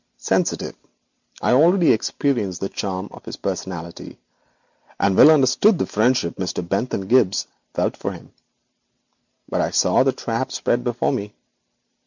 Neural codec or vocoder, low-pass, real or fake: none; 7.2 kHz; real